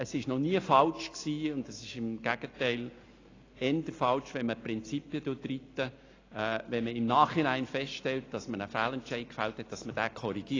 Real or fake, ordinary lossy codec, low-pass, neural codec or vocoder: real; AAC, 32 kbps; 7.2 kHz; none